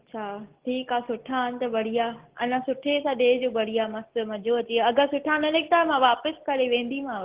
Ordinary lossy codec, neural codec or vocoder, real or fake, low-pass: Opus, 16 kbps; none; real; 3.6 kHz